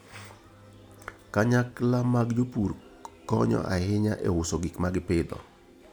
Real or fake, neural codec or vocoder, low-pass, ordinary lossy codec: real; none; none; none